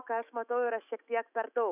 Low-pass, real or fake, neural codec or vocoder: 3.6 kHz; fake; autoencoder, 48 kHz, 128 numbers a frame, DAC-VAE, trained on Japanese speech